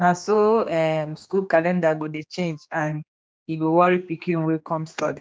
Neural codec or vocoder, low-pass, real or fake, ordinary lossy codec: codec, 16 kHz, 2 kbps, X-Codec, HuBERT features, trained on general audio; none; fake; none